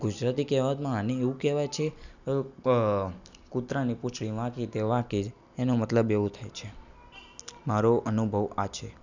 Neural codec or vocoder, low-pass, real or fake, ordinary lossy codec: none; 7.2 kHz; real; none